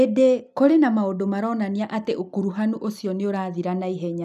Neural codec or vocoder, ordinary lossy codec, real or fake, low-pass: none; none; real; 14.4 kHz